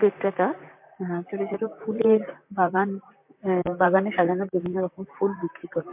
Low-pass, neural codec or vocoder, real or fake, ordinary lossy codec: 3.6 kHz; vocoder, 44.1 kHz, 128 mel bands, Pupu-Vocoder; fake; MP3, 32 kbps